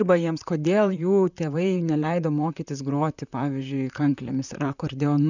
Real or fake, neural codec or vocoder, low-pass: fake; vocoder, 44.1 kHz, 128 mel bands, Pupu-Vocoder; 7.2 kHz